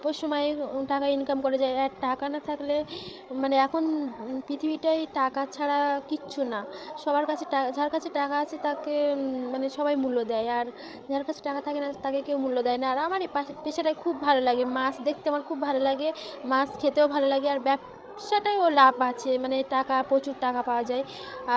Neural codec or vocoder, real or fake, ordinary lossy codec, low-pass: codec, 16 kHz, 8 kbps, FreqCodec, larger model; fake; none; none